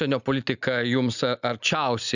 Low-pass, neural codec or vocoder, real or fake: 7.2 kHz; none; real